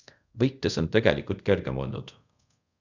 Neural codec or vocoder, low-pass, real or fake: codec, 24 kHz, 0.5 kbps, DualCodec; 7.2 kHz; fake